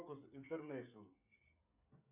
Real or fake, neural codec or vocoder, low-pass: fake; codec, 16 kHz, 16 kbps, FreqCodec, smaller model; 3.6 kHz